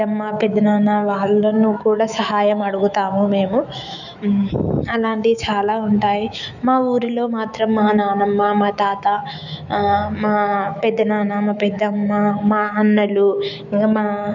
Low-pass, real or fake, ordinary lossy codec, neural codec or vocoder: 7.2 kHz; fake; none; autoencoder, 48 kHz, 128 numbers a frame, DAC-VAE, trained on Japanese speech